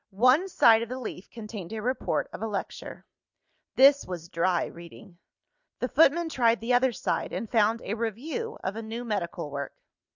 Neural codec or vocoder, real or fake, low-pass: none; real; 7.2 kHz